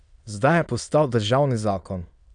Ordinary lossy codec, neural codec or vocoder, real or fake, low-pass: none; autoencoder, 22.05 kHz, a latent of 192 numbers a frame, VITS, trained on many speakers; fake; 9.9 kHz